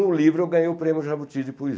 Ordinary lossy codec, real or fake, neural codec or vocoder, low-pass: none; real; none; none